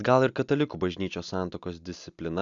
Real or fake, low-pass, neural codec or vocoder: real; 7.2 kHz; none